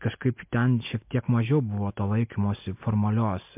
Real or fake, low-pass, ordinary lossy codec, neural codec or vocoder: real; 3.6 kHz; MP3, 32 kbps; none